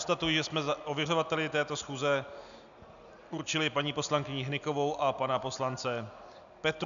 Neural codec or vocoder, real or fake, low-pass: none; real; 7.2 kHz